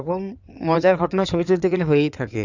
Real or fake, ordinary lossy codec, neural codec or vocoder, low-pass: fake; none; codec, 16 kHz in and 24 kHz out, 2.2 kbps, FireRedTTS-2 codec; 7.2 kHz